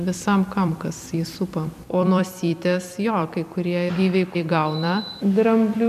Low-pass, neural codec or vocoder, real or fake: 14.4 kHz; vocoder, 44.1 kHz, 128 mel bands every 256 samples, BigVGAN v2; fake